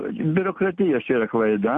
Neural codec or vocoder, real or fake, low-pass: none; real; 10.8 kHz